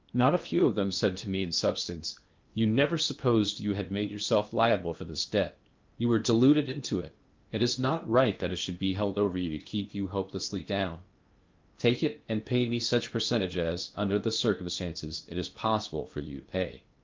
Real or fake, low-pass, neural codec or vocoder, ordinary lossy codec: fake; 7.2 kHz; codec, 16 kHz in and 24 kHz out, 0.8 kbps, FocalCodec, streaming, 65536 codes; Opus, 24 kbps